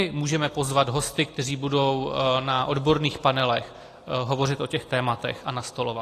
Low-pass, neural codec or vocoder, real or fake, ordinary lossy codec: 14.4 kHz; none; real; AAC, 48 kbps